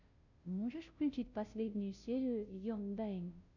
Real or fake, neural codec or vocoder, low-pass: fake; codec, 16 kHz, 0.5 kbps, FunCodec, trained on Chinese and English, 25 frames a second; 7.2 kHz